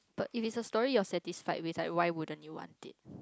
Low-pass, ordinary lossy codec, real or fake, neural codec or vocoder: none; none; real; none